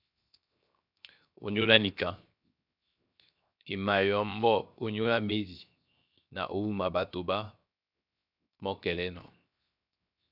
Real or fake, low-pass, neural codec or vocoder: fake; 5.4 kHz; codec, 16 kHz, 0.7 kbps, FocalCodec